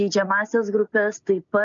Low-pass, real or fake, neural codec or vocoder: 7.2 kHz; real; none